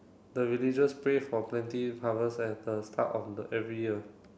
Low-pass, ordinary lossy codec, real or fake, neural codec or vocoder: none; none; real; none